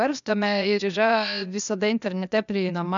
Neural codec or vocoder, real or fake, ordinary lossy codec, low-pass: codec, 16 kHz, 0.8 kbps, ZipCodec; fake; MP3, 96 kbps; 7.2 kHz